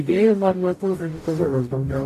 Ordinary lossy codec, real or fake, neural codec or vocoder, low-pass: AAC, 64 kbps; fake; codec, 44.1 kHz, 0.9 kbps, DAC; 14.4 kHz